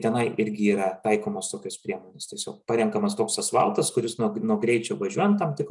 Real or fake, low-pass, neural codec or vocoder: real; 10.8 kHz; none